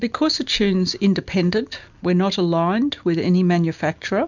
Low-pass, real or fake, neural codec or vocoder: 7.2 kHz; real; none